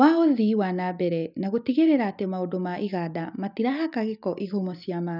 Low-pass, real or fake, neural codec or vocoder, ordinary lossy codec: 5.4 kHz; real; none; none